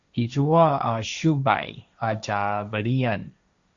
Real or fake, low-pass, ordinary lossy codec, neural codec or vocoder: fake; 7.2 kHz; Opus, 64 kbps; codec, 16 kHz, 1.1 kbps, Voila-Tokenizer